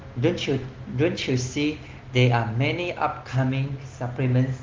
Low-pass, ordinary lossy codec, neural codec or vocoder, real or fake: 7.2 kHz; Opus, 16 kbps; none; real